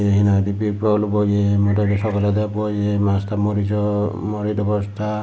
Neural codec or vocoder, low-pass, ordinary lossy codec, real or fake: none; none; none; real